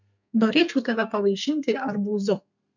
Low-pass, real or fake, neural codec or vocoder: 7.2 kHz; fake; codec, 32 kHz, 1.9 kbps, SNAC